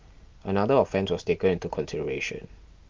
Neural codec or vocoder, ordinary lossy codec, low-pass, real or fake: none; Opus, 32 kbps; 7.2 kHz; real